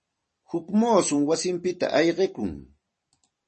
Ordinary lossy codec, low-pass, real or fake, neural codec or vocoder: MP3, 32 kbps; 10.8 kHz; fake; vocoder, 44.1 kHz, 128 mel bands every 256 samples, BigVGAN v2